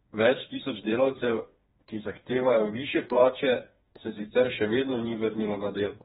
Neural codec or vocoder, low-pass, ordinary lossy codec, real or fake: codec, 16 kHz, 2 kbps, FreqCodec, smaller model; 7.2 kHz; AAC, 16 kbps; fake